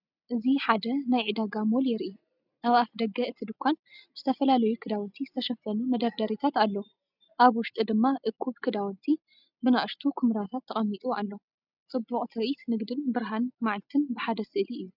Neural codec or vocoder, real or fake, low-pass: none; real; 5.4 kHz